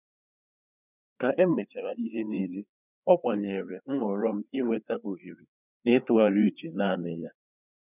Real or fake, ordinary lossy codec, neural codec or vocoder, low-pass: fake; none; codec, 16 kHz, 4 kbps, FreqCodec, larger model; 3.6 kHz